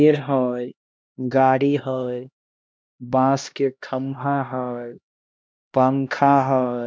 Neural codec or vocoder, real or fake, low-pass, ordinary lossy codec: codec, 16 kHz, 1 kbps, X-Codec, HuBERT features, trained on LibriSpeech; fake; none; none